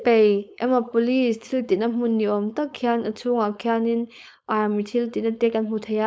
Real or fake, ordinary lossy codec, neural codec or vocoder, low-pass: fake; none; codec, 16 kHz, 4.8 kbps, FACodec; none